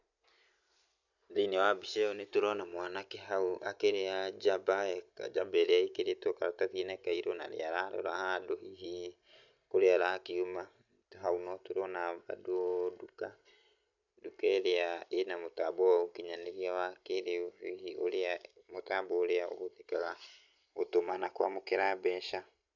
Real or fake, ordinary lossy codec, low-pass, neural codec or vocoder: real; none; 7.2 kHz; none